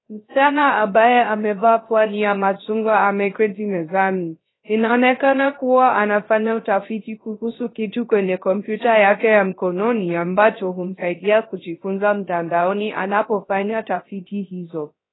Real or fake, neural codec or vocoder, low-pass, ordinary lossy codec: fake; codec, 16 kHz, 0.3 kbps, FocalCodec; 7.2 kHz; AAC, 16 kbps